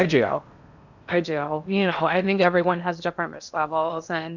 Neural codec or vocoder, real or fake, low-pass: codec, 16 kHz in and 24 kHz out, 0.8 kbps, FocalCodec, streaming, 65536 codes; fake; 7.2 kHz